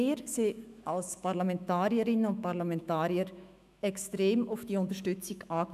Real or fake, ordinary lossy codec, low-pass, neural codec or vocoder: fake; none; 14.4 kHz; autoencoder, 48 kHz, 128 numbers a frame, DAC-VAE, trained on Japanese speech